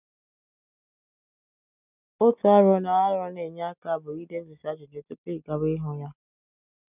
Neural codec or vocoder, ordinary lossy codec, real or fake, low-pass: none; none; real; 3.6 kHz